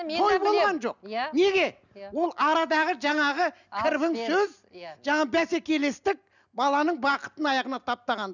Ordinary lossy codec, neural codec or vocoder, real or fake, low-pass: none; none; real; 7.2 kHz